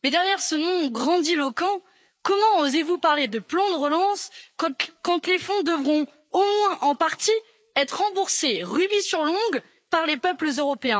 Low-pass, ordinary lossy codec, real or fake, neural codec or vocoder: none; none; fake; codec, 16 kHz, 4 kbps, FreqCodec, larger model